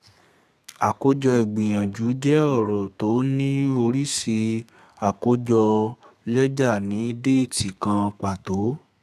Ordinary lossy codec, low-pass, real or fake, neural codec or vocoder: none; 14.4 kHz; fake; codec, 32 kHz, 1.9 kbps, SNAC